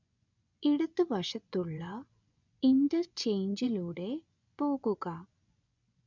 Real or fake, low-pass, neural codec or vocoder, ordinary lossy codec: fake; 7.2 kHz; vocoder, 24 kHz, 100 mel bands, Vocos; none